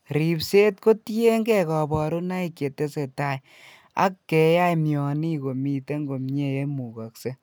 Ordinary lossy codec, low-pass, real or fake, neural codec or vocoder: none; none; real; none